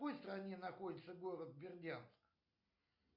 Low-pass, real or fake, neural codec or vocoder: 5.4 kHz; real; none